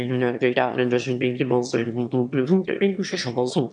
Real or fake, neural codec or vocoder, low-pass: fake; autoencoder, 22.05 kHz, a latent of 192 numbers a frame, VITS, trained on one speaker; 9.9 kHz